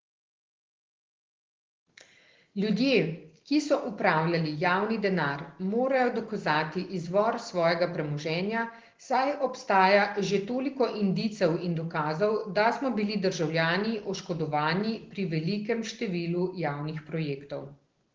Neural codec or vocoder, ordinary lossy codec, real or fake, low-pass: none; Opus, 16 kbps; real; 7.2 kHz